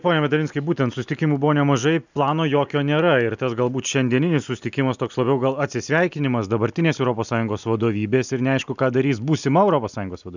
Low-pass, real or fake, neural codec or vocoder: 7.2 kHz; real; none